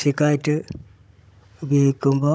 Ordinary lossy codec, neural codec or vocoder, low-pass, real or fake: none; codec, 16 kHz, 16 kbps, FunCodec, trained on Chinese and English, 50 frames a second; none; fake